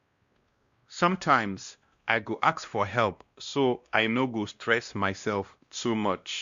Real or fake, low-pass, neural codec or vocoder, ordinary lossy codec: fake; 7.2 kHz; codec, 16 kHz, 1 kbps, X-Codec, WavLM features, trained on Multilingual LibriSpeech; Opus, 64 kbps